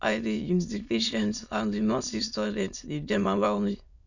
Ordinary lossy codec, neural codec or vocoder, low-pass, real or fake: none; autoencoder, 22.05 kHz, a latent of 192 numbers a frame, VITS, trained on many speakers; 7.2 kHz; fake